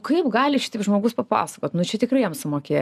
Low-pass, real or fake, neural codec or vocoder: 14.4 kHz; real; none